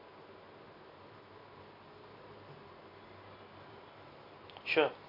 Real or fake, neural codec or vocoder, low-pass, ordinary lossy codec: real; none; 5.4 kHz; AAC, 32 kbps